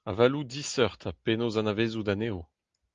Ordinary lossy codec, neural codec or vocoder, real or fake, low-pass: Opus, 32 kbps; none; real; 7.2 kHz